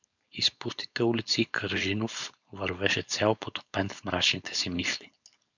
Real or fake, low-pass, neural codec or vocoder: fake; 7.2 kHz; codec, 16 kHz, 4.8 kbps, FACodec